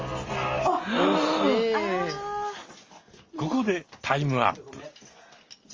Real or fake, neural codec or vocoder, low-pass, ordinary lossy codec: real; none; 7.2 kHz; Opus, 32 kbps